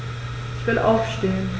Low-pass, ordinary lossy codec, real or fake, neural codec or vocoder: none; none; real; none